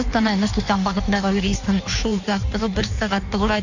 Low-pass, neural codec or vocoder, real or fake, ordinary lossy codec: 7.2 kHz; codec, 16 kHz in and 24 kHz out, 1.1 kbps, FireRedTTS-2 codec; fake; none